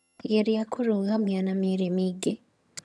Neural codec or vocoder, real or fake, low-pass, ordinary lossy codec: vocoder, 22.05 kHz, 80 mel bands, HiFi-GAN; fake; none; none